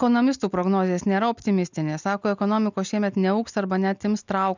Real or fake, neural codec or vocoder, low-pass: real; none; 7.2 kHz